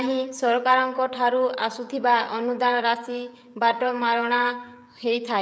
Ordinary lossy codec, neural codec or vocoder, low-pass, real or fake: none; codec, 16 kHz, 16 kbps, FreqCodec, smaller model; none; fake